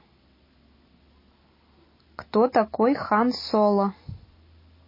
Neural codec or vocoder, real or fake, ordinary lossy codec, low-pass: none; real; MP3, 24 kbps; 5.4 kHz